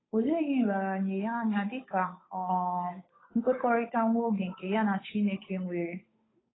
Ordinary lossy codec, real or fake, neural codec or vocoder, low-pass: AAC, 16 kbps; fake; codec, 16 kHz, 8 kbps, FunCodec, trained on Chinese and English, 25 frames a second; 7.2 kHz